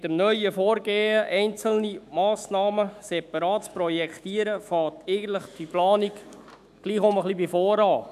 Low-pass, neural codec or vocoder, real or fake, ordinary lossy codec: 14.4 kHz; autoencoder, 48 kHz, 128 numbers a frame, DAC-VAE, trained on Japanese speech; fake; none